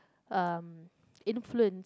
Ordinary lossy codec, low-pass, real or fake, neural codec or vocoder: none; none; real; none